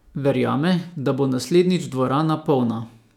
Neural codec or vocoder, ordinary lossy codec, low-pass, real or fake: autoencoder, 48 kHz, 128 numbers a frame, DAC-VAE, trained on Japanese speech; none; 19.8 kHz; fake